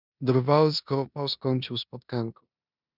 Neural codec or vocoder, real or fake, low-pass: codec, 16 kHz in and 24 kHz out, 0.9 kbps, LongCat-Audio-Codec, four codebook decoder; fake; 5.4 kHz